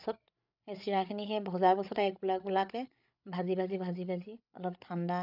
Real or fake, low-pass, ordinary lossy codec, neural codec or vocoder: fake; 5.4 kHz; none; codec, 16 kHz, 8 kbps, FreqCodec, larger model